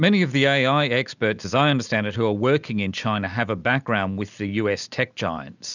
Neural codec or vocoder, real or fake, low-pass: none; real; 7.2 kHz